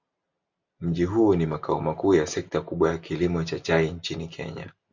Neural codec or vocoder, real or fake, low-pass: none; real; 7.2 kHz